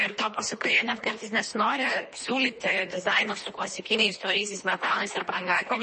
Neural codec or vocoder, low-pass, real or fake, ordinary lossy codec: codec, 24 kHz, 1.5 kbps, HILCodec; 10.8 kHz; fake; MP3, 32 kbps